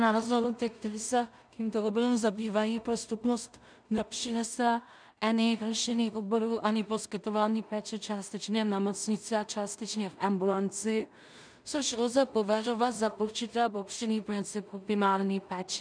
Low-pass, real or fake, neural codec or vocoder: 9.9 kHz; fake; codec, 16 kHz in and 24 kHz out, 0.4 kbps, LongCat-Audio-Codec, two codebook decoder